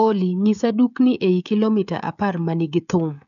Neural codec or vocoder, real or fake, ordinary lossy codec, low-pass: codec, 16 kHz, 16 kbps, FreqCodec, smaller model; fake; none; 7.2 kHz